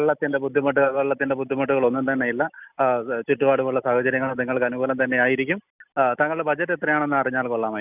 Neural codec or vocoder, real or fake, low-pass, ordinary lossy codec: none; real; 3.6 kHz; none